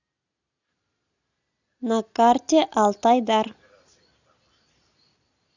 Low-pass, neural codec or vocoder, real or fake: 7.2 kHz; none; real